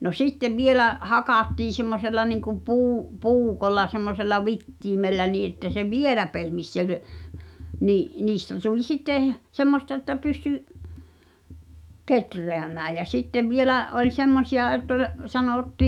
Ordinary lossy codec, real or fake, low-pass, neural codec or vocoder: none; fake; 19.8 kHz; autoencoder, 48 kHz, 128 numbers a frame, DAC-VAE, trained on Japanese speech